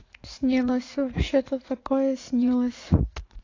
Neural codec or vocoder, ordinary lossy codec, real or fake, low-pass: vocoder, 44.1 kHz, 80 mel bands, Vocos; AAC, 32 kbps; fake; 7.2 kHz